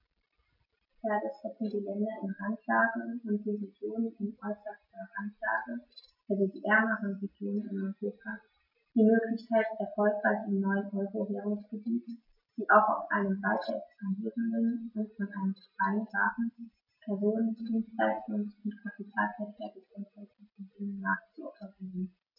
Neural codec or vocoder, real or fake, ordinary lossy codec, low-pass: none; real; MP3, 48 kbps; 5.4 kHz